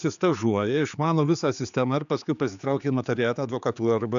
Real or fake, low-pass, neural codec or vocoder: fake; 7.2 kHz; codec, 16 kHz, 4 kbps, X-Codec, HuBERT features, trained on general audio